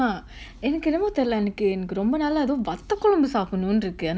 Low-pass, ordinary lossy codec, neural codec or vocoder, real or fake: none; none; none; real